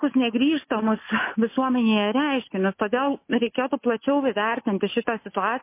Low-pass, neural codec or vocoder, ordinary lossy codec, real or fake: 3.6 kHz; none; MP3, 24 kbps; real